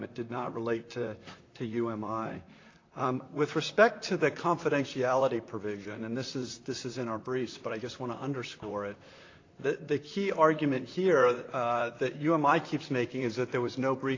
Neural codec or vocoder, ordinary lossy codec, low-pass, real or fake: vocoder, 44.1 kHz, 128 mel bands, Pupu-Vocoder; AAC, 32 kbps; 7.2 kHz; fake